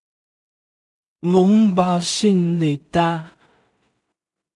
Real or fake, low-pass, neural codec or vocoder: fake; 10.8 kHz; codec, 16 kHz in and 24 kHz out, 0.4 kbps, LongCat-Audio-Codec, two codebook decoder